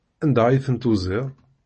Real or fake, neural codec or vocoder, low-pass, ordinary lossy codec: real; none; 10.8 kHz; MP3, 32 kbps